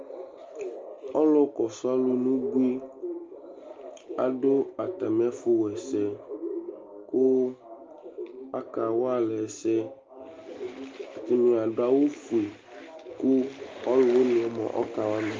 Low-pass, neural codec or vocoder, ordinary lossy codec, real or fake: 7.2 kHz; none; Opus, 32 kbps; real